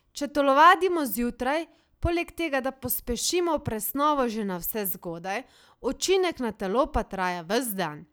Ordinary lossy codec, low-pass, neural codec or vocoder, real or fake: none; none; none; real